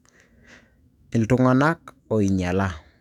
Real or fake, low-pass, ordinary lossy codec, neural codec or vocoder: fake; 19.8 kHz; none; autoencoder, 48 kHz, 128 numbers a frame, DAC-VAE, trained on Japanese speech